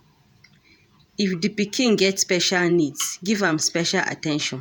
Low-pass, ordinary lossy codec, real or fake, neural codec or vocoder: none; none; fake; vocoder, 48 kHz, 128 mel bands, Vocos